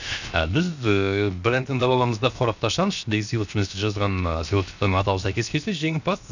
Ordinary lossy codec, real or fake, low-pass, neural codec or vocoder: none; fake; 7.2 kHz; codec, 16 kHz, 0.7 kbps, FocalCodec